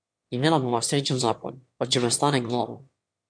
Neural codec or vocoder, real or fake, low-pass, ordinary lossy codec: autoencoder, 22.05 kHz, a latent of 192 numbers a frame, VITS, trained on one speaker; fake; 9.9 kHz; MP3, 64 kbps